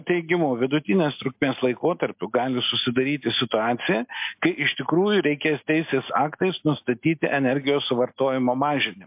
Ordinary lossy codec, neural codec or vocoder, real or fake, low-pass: MP3, 32 kbps; none; real; 3.6 kHz